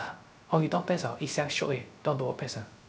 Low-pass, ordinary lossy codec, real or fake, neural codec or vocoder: none; none; fake; codec, 16 kHz, 0.3 kbps, FocalCodec